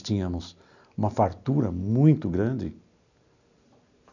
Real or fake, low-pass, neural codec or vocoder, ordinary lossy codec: real; 7.2 kHz; none; none